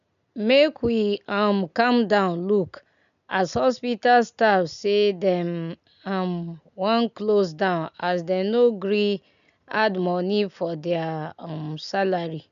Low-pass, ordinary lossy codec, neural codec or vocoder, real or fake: 7.2 kHz; none; none; real